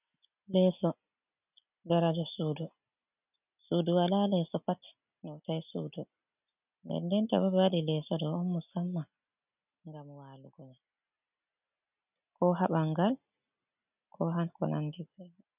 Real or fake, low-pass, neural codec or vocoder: real; 3.6 kHz; none